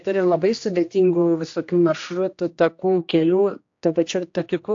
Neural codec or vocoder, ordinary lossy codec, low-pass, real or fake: codec, 16 kHz, 1 kbps, X-Codec, HuBERT features, trained on general audio; AAC, 48 kbps; 7.2 kHz; fake